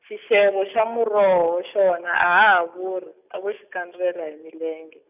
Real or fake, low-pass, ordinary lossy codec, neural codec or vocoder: real; 3.6 kHz; none; none